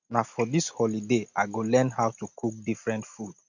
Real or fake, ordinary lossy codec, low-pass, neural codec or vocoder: real; none; 7.2 kHz; none